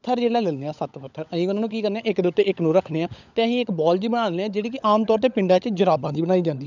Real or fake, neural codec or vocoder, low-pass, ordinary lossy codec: fake; codec, 16 kHz, 16 kbps, FunCodec, trained on LibriTTS, 50 frames a second; 7.2 kHz; none